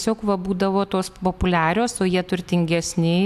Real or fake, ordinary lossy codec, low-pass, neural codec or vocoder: real; AAC, 96 kbps; 14.4 kHz; none